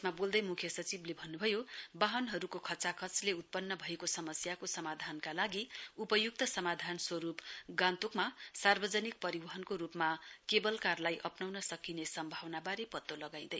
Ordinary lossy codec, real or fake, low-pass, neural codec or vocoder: none; real; none; none